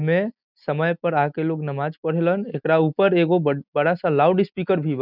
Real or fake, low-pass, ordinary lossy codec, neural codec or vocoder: real; 5.4 kHz; none; none